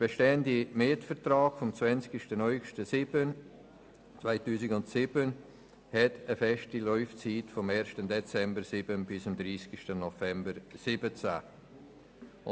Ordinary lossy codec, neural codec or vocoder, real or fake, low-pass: none; none; real; none